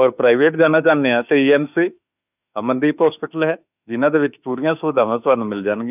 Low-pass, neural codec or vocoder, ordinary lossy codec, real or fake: 3.6 kHz; autoencoder, 48 kHz, 32 numbers a frame, DAC-VAE, trained on Japanese speech; none; fake